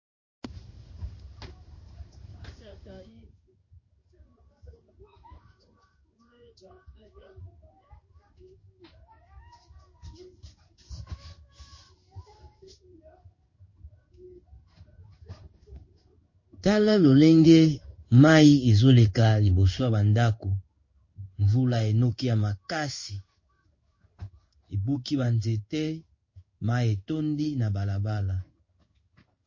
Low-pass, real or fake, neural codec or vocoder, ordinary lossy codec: 7.2 kHz; fake; codec, 16 kHz in and 24 kHz out, 1 kbps, XY-Tokenizer; MP3, 32 kbps